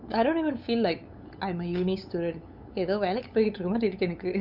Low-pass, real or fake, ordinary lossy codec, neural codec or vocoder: 5.4 kHz; fake; none; codec, 16 kHz, 8 kbps, FunCodec, trained on LibriTTS, 25 frames a second